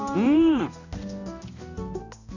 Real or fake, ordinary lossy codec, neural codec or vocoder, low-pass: fake; none; codec, 16 kHz, 1 kbps, X-Codec, HuBERT features, trained on balanced general audio; 7.2 kHz